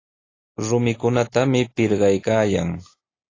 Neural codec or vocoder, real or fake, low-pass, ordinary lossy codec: none; real; 7.2 kHz; AAC, 32 kbps